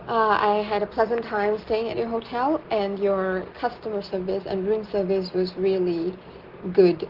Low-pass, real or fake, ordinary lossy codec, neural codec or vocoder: 5.4 kHz; real; Opus, 16 kbps; none